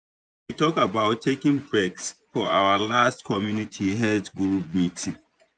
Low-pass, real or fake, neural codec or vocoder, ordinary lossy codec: 9.9 kHz; fake; vocoder, 24 kHz, 100 mel bands, Vocos; none